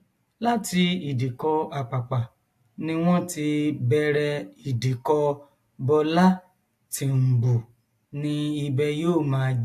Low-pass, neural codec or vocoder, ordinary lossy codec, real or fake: 14.4 kHz; none; AAC, 64 kbps; real